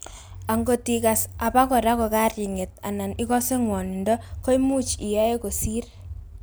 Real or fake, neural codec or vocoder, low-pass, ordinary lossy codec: real; none; none; none